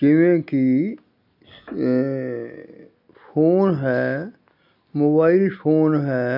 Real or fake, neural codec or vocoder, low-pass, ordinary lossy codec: real; none; 5.4 kHz; AAC, 48 kbps